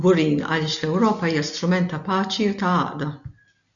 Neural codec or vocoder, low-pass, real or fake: none; 7.2 kHz; real